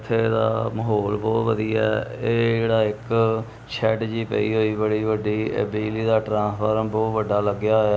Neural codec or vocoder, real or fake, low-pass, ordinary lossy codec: none; real; none; none